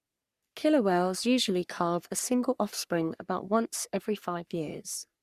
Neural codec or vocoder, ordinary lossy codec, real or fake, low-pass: codec, 44.1 kHz, 3.4 kbps, Pupu-Codec; Opus, 64 kbps; fake; 14.4 kHz